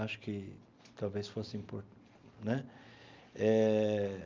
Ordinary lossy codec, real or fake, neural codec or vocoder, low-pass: Opus, 32 kbps; real; none; 7.2 kHz